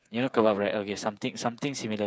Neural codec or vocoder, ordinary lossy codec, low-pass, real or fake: codec, 16 kHz, 8 kbps, FreqCodec, smaller model; none; none; fake